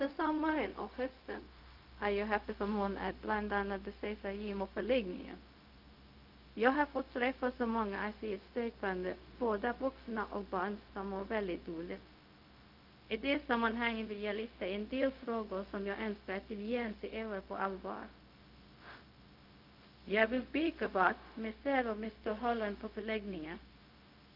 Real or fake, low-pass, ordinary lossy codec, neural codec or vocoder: fake; 5.4 kHz; Opus, 32 kbps; codec, 16 kHz, 0.4 kbps, LongCat-Audio-Codec